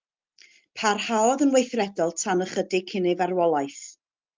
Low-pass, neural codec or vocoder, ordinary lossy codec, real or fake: 7.2 kHz; none; Opus, 24 kbps; real